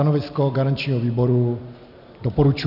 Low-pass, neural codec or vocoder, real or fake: 5.4 kHz; none; real